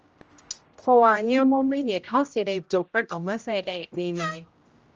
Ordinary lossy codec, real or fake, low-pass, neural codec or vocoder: Opus, 24 kbps; fake; 7.2 kHz; codec, 16 kHz, 0.5 kbps, X-Codec, HuBERT features, trained on general audio